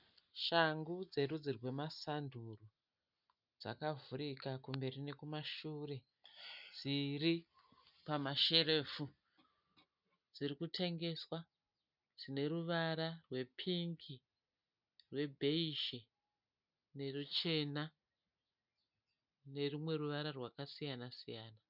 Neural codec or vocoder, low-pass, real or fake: none; 5.4 kHz; real